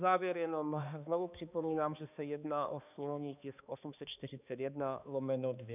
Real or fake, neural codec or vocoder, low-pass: fake; codec, 16 kHz, 2 kbps, X-Codec, HuBERT features, trained on balanced general audio; 3.6 kHz